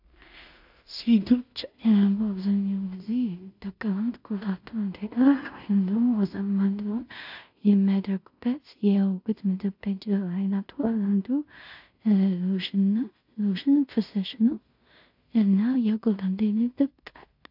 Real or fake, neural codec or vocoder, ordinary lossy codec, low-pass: fake; codec, 16 kHz in and 24 kHz out, 0.4 kbps, LongCat-Audio-Codec, two codebook decoder; AAC, 48 kbps; 5.4 kHz